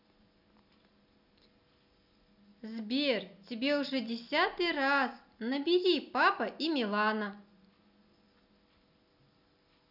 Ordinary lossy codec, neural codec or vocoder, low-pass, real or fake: none; none; 5.4 kHz; real